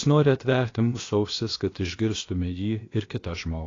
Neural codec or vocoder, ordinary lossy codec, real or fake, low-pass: codec, 16 kHz, about 1 kbps, DyCAST, with the encoder's durations; AAC, 32 kbps; fake; 7.2 kHz